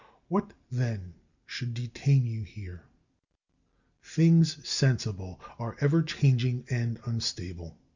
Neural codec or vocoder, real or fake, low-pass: none; real; 7.2 kHz